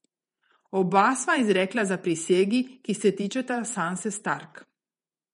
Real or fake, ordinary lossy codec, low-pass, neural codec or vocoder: fake; MP3, 48 kbps; 19.8 kHz; vocoder, 48 kHz, 128 mel bands, Vocos